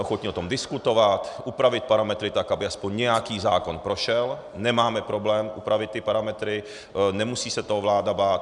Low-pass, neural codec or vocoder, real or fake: 10.8 kHz; none; real